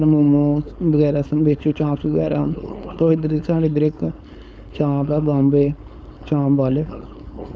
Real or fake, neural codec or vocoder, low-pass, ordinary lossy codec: fake; codec, 16 kHz, 4.8 kbps, FACodec; none; none